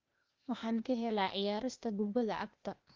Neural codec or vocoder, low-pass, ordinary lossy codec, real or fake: codec, 16 kHz, 0.8 kbps, ZipCodec; 7.2 kHz; Opus, 32 kbps; fake